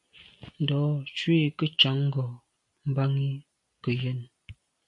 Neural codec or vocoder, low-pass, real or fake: none; 10.8 kHz; real